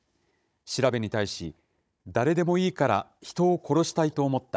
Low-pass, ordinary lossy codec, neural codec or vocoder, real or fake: none; none; codec, 16 kHz, 16 kbps, FunCodec, trained on Chinese and English, 50 frames a second; fake